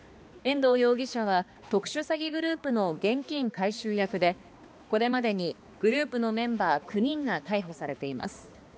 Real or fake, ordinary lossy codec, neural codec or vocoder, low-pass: fake; none; codec, 16 kHz, 2 kbps, X-Codec, HuBERT features, trained on balanced general audio; none